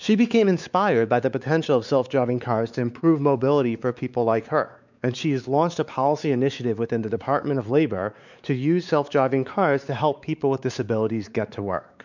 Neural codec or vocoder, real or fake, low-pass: codec, 16 kHz, 4 kbps, X-Codec, WavLM features, trained on Multilingual LibriSpeech; fake; 7.2 kHz